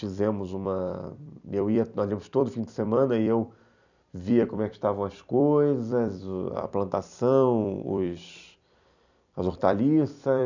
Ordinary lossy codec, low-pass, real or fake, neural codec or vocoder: none; 7.2 kHz; fake; vocoder, 44.1 kHz, 128 mel bands every 256 samples, BigVGAN v2